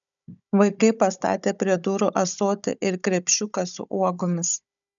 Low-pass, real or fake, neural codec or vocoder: 7.2 kHz; fake; codec, 16 kHz, 16 kbps, FunCodec, trained on Chinese and English, 50 frames a second